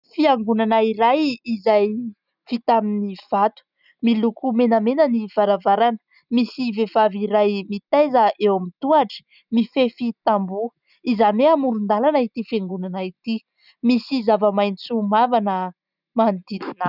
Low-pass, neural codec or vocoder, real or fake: 5.4 kHz; none; real